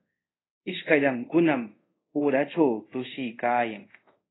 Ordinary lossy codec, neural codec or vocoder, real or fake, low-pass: AAC, 16 kbps; codec, 24 kHz, 0.5 kbps, DualCodec; fake; 7.2 kHz